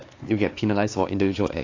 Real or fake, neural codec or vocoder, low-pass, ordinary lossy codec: fake; codec, 16 kHz, 4 kbps, X-Codec, HuBERT features, trained on LibriSpeech; 7.2 kHz; AAC, 32 kbps